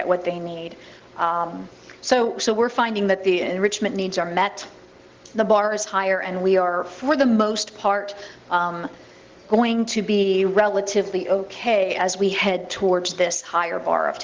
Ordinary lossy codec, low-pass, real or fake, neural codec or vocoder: Opus, 16 kbps; 7.2 kHz; real; none